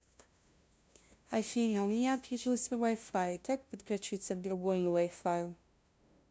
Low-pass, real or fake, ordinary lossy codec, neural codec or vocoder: none; fake; none; codec, 16 kHz, 0.5 kbps, FunCodec, trained on LibriTTS, 25 frames a second